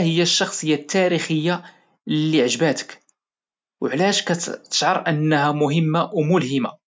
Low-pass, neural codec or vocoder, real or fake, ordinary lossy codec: none; none; real; none